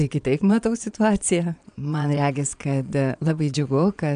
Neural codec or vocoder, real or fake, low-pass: vocoder, 22.05 kHz, 80 mel bands, Vocos; fake; 9.9 kHz